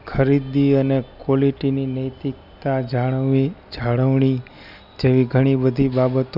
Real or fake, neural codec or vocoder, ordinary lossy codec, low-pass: real; none; none; 5.4 kHz